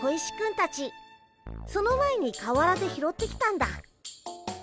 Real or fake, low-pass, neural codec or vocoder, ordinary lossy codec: real; none; none; none